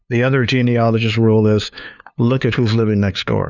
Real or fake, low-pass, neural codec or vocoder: fake; 7.2 kHz; codec, 16 kHz, 2 kbps, FunCodec, trained on LibriTTS, 25 frames a second